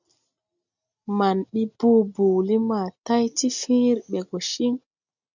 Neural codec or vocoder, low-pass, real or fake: none; 7.2 kHz; real